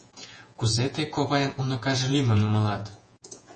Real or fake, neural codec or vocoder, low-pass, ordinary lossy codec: fake; vocoder, 48 kHz, 128 mel bands, Vocos; 9.9 kHz; MP3, 32 kbps